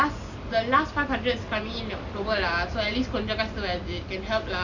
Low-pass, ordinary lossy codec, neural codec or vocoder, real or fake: 7.2 kHz; none; none; real